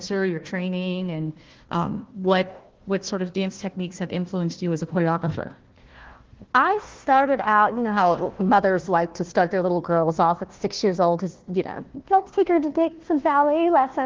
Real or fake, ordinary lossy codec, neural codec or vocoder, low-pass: fake; Opus, 16 kbps; codec, 16 kHz, 1 kbps, FunCodec, trained on Chinese and English, 50 frames a second; 7.2 kHz